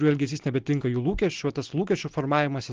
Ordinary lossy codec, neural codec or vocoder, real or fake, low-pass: Opus, 16 kbps; none; real; 7.2 kHz